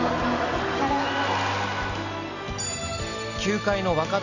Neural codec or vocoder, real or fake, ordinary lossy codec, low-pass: none; real; none; 7.2 kHz